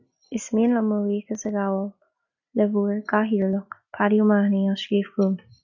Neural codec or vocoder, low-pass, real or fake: none; 7.2 kHz; real